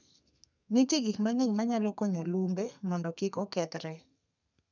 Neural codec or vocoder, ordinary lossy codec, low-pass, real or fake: codec, 32 kHz, 1.9 kbps, SNAC; none; 7.2 kHz; fake